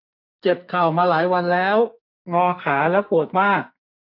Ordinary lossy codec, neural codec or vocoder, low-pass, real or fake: none; codec, 44.1 kHz, 2.6 kbps, SNAC; 5.4 kHz; fake